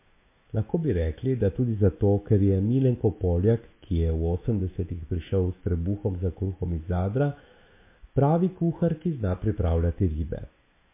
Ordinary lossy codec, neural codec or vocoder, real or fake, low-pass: MP3, 24 kbps; autoencoder, 48 kHz, 128 numbers a frame, DAC-VAE, trained on Japanese speech; fake; 3.6 kHz